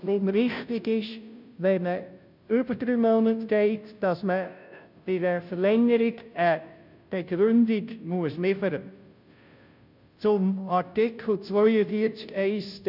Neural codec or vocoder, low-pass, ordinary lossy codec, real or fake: codec, 16 kHz, 0.5 kbps, FunCodec, trained on Chinese and English, 25 frames a second; 5.4 kHz; none; fake